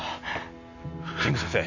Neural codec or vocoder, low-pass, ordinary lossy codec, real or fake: none; 7.2 kHz; none; real